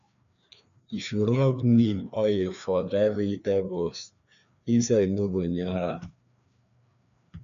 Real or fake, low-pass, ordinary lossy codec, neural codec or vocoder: fake; 7.2 kHz; none; codec, 16 kHz, 2 kbps, FreqCodec, larger model